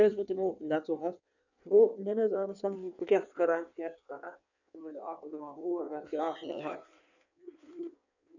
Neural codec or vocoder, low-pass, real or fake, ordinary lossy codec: codec, 16 kHz in and 24 kHz out, 1.1 kbps, FireRedTTS-2 codec; 7.2 kHz; fake; none